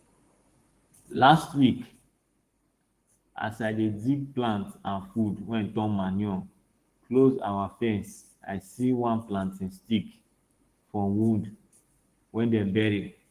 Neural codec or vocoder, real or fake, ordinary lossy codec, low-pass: codec, 44.1 kHz, 7.8 kbps, Pupu-Codec; fake; Opus, 24 kbps; 14.4 kHz